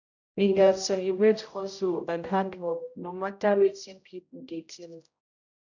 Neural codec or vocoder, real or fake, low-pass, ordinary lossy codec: codec, 16 kHz, 0.5 kbps, X-Codec, HuBERT features, trained on general audio; fake; 7.2 kHz; none